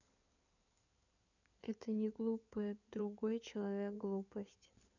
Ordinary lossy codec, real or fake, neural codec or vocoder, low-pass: none; fake; codec, 16 kHz in and 24 kHz out, 2.2 kbps, FireRedTTS-2 codec; 7.2 kHz